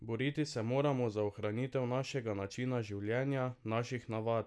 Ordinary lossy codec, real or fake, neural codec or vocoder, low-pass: none; real; none; none